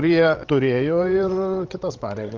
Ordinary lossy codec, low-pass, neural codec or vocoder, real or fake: Opus, 24 kbps; 7.2 kHz; codec, 16 kHz, 16 kbps, FreqCodec, larger model; fake